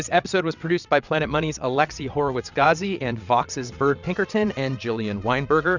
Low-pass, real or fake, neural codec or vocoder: 7.2 kHz; fake; vocoder, 22.05 kHz, 80 mel bands, WaveNeXt